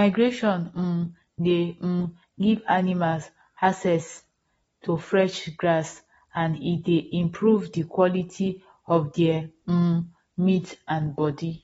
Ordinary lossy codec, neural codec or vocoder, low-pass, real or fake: AAC, 24 kbps; none; 19.8 kHz; real